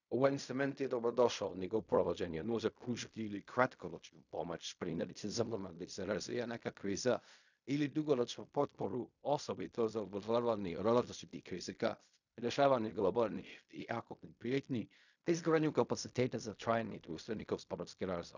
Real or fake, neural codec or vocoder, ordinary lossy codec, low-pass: fake; codec, 16 kHz in and 24 kHz out, 0.4 kbps, LongCat-Audio-Codec, fine tuned four codebook decoder; none; 7.2 kHz